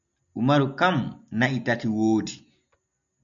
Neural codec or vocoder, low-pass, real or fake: none; 7.2 kHz; real